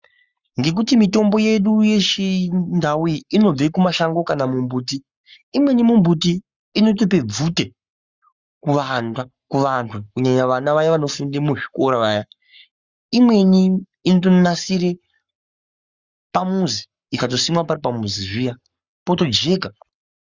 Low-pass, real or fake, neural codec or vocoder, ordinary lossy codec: 7.2 kHz; fake; codec, 44.1 kHz, 7.8 kbps, Pupu-Codec; Opus, 64 kbps